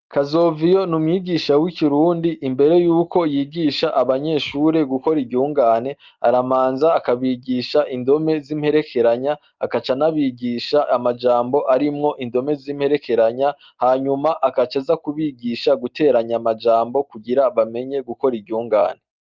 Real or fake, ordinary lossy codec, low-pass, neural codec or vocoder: real; Opus, 24 kbps; 7.2 kHz; none